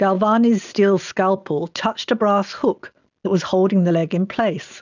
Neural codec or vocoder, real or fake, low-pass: none; real; 7.2 kHz